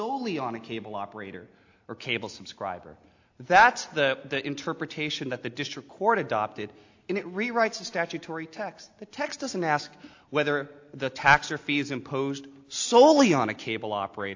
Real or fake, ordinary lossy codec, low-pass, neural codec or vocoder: real; AAC, 48 kbps; 7.2 kHz; none